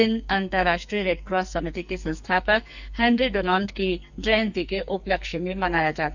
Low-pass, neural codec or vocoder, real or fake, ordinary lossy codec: 7.2 kHz; codec, 44.1 kHz, 2.6 kbps, SNAC; fake; none